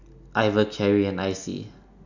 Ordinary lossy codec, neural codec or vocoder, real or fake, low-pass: none; none; real; 7.2 kHz